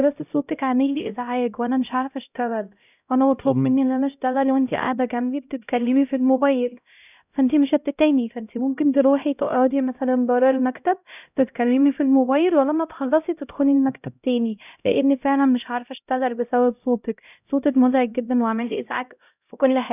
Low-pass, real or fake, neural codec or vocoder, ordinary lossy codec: 3.6 kHz; fake; codec, 16 kHz, 0.5 kbps, X-Codec, HuBERT features, trained on LibriSpeech; none